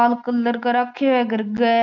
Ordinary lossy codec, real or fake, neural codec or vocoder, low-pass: none; real; none; 7.2 kHz